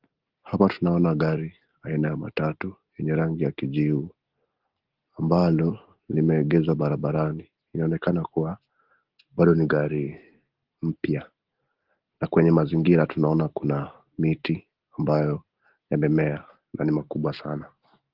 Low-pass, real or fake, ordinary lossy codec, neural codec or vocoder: 5.4 kHz; real; Opus, 16 kbps; none